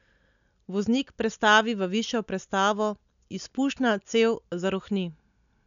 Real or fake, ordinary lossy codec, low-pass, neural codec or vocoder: real; none; 7.2 kHz; none